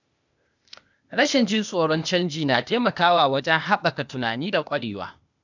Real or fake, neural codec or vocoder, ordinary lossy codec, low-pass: fake; codec, 16 kHz, 0.8 kbps, ZipCodec; none; 7.2 kHz